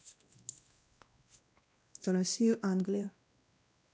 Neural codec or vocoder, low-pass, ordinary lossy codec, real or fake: codec, 16 kHz, 1 kbps, X-Codec, WavLM features, trained on Multilingual LibriSpeech; none; none; fake